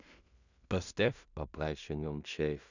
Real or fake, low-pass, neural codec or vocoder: fake; 7.2 kHz; codec, 16 kHz in and 24 kHz out, 0.4 kbps, LongCat-Audio-Codec, two codebook decoder